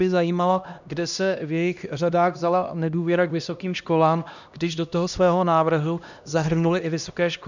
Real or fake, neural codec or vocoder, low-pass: fake; codec, 16 kHz, 1 kbps, X-Codec, HuBERT features, trained on LibriSpeech; 7.2 kHz